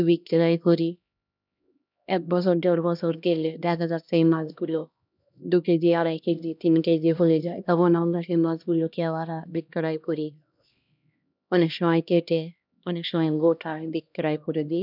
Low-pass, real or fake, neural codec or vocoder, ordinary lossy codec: 5.4 kHz; fake; codec, 16 kHz, 1 kbps, X-Codec, HuBERT features, trained on LibriSpeech; none